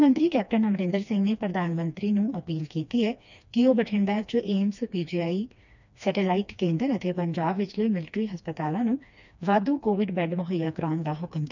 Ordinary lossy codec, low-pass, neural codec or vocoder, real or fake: none; 7.2 kHz; codec, 16 kHz, 2 kbps, FreqCodec, smaller model; fake